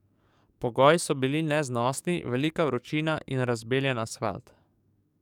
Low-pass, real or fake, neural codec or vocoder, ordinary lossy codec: 19.8 kHz; fake; codec, 44.1 kHz, 7.8 kbps, DAC; none